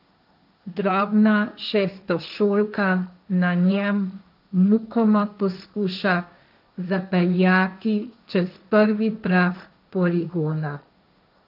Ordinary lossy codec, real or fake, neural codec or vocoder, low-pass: none; fake; codec, 16 kHz, 1.1 kbps, Voila-Tokenizer; 5.4 kHz